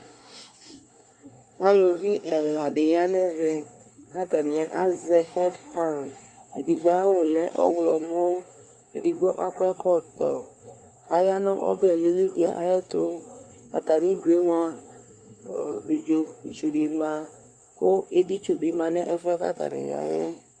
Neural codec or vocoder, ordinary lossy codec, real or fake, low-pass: codec, 24 kHz, 1 kbps, SNAC; Opus, 64 kbps; fake; 9.9 kHz